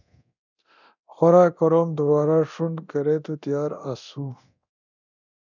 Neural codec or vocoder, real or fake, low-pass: codec, 24 kHz, 0.9 kbps, DualCodec; fake; 7.2 kHz